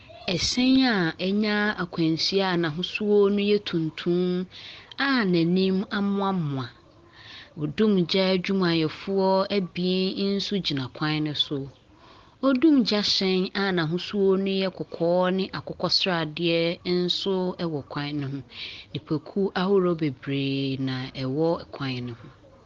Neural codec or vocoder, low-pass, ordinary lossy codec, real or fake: none; 7.2 kHz; Opus, 16 kbps; real